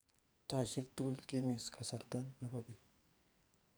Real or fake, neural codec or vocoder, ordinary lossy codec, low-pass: fake; codec, 44.1 kHz, 2.6 kbps, SNAC; none; none